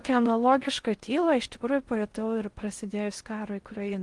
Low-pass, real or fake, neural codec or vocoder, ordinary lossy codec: 10.8 kHz; fake; codec, 16 kHz in and 24 kHz out, 0.6 kbps, FocalCodec, streaming, 4096 codes; Opus, 24 kbps